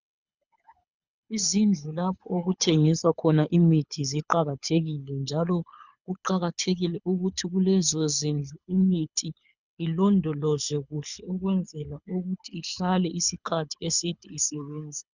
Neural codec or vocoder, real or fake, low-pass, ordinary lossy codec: codec, 24 kHz, 6 kbps, HILCodec; fake; 7.2 kHz; Opus, 64 kbps